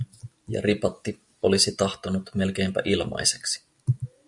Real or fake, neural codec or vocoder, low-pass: real; none; 10.8 kHz